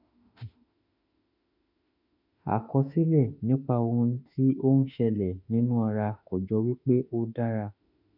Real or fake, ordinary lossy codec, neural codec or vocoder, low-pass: fake; MP3, 48 kbps; autoencoder, 48 kHz, 32 numbers a frame, DAC-VAE, trained on Japanese speech; 5.4 kHz